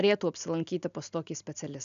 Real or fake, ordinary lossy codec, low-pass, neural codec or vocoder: real; MP3, 96 kbps; 7.2 kHz; none